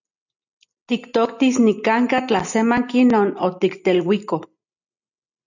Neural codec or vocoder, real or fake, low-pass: none; real; 7.2 kHz